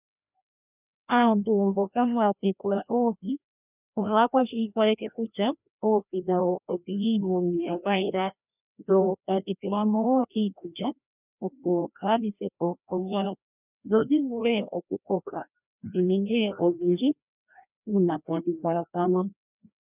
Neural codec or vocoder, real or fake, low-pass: codec, 16 kHz, 1 kbps, FreqCodec, larger model; fake; 3.6 kHz